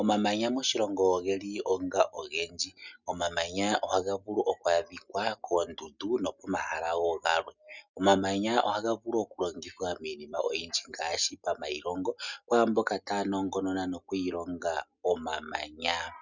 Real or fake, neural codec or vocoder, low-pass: real; none; 7.2 kHz